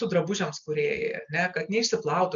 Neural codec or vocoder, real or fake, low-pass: none; real; 7.2 kHz